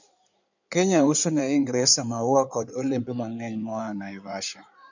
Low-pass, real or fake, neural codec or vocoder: 7.2 kHz; fake; codec, 16 kHz in and 24 kHz out, 2.2 kbps, FireRedTTS-2 codec